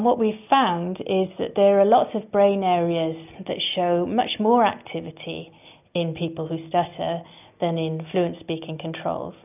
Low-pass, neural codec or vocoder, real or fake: 3.6 kHz; none; real